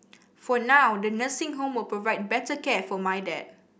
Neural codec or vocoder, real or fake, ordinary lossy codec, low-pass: none; real; none; none